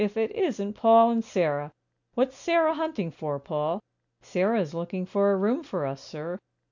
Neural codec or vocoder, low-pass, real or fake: none; 7.2 kHz; real